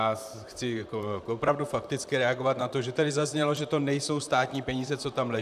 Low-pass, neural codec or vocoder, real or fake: 14.4 kHz; vocoder, 44.1 kHz, 128 mel bands, Pupu-Vocoder; fake